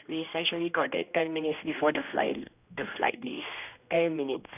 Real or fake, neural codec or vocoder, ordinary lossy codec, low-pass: fake; codec, 16 kHz, 1 kbps, X-Codec, HuBERT features, trained on general audio; none; 3.6 kHz